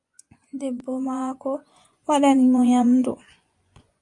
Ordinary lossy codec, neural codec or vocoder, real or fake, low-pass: MP3, 64 kbps; vocoder, 24 kHz, 100 mel bands, Vocos; fake; 10.8 kHz